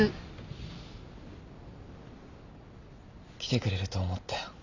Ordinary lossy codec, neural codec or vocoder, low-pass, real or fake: none; none; 7.2 kHz; real